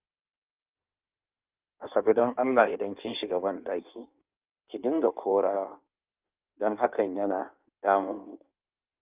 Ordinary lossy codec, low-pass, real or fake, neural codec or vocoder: Opus, 24 kbps; 3.6 kHz; fake; codec, 16 kHz in and 24 kHz out, 1.1 kbps, FireRedTTS-2 codec